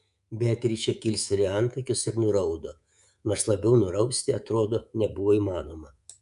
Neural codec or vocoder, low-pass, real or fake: codec, 24 kHz, 3.1 kbps, DualCodec; 10.8 kHz; fake